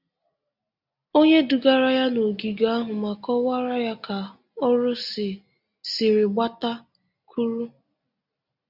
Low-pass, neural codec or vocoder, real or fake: 5.4 kHz; none; real